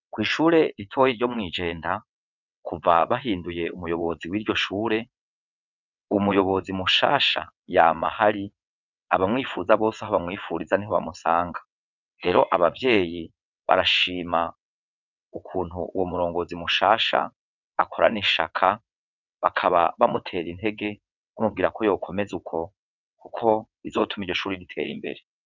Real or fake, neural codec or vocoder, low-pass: fake; vocoder, 22.05 kHz, 80 mel bands, WaveNeXt; 7.2 kHz